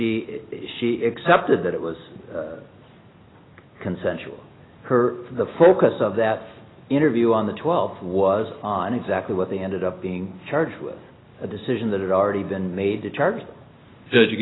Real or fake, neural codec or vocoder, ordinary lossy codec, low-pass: real; none; AAC, 16 kbps; 7.2 kHz